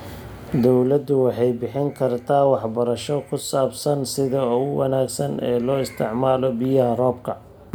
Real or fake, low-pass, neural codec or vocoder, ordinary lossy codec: real; none; none; none